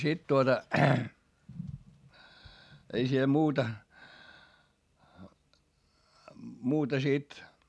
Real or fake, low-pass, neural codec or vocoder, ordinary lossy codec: real; none; none; none